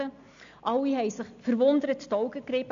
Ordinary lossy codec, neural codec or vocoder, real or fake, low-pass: AAC, 64 kbps; none; real; 7.2 kHz